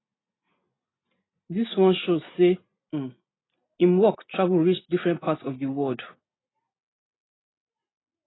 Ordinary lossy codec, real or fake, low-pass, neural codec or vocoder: AAC, 16 kbps; real; 7.2 kHz; none